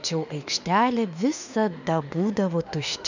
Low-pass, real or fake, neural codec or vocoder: 7.2 kHz; fake; autoencoder, 48 kHz, 32 numbers a frame, DAC-VAE, trained on Japanese speech